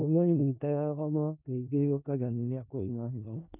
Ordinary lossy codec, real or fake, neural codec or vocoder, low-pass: none; fake; codec, 16 kHz in and 24 kHz out, 0.4 kbps, LongCat-Audio-Codec, four codebook decoder; 3.6 kHz